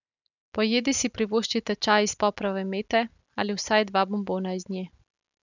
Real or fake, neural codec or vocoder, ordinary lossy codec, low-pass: real; none; none; 7.2 kHz